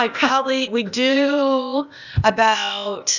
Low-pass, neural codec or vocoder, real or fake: 7.2 kHz; codec, 16 kHz, 0.8 kbps, ZipCodec; fake